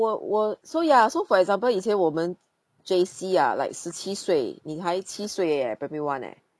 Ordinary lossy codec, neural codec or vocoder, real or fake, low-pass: none; none; real; none